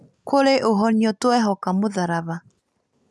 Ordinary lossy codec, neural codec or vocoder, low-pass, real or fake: none; none; none; real